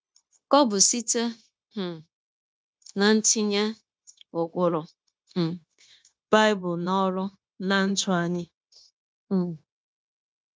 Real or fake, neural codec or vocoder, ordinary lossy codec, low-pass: fake; codec, 16 kHz, 0.9 kbps, LongCat-Audio-Codec; none; none